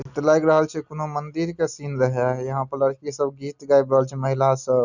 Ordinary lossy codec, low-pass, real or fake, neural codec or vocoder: none; 7.2 kHz; real; none